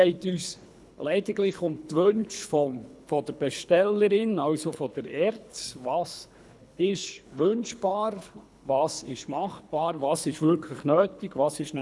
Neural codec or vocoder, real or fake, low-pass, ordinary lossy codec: codec, 24 kHz, 3 kbps, HILCodec; fake; none; none